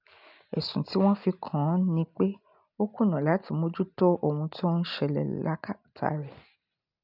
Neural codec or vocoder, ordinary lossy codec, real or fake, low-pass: none; none; real; 5.4 kHz